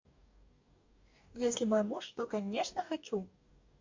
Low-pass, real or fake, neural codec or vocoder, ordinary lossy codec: 7.2 kHz; fake; codec, 44.1 kHz, 2.6 kbps, DAC; MP3, 64 kbps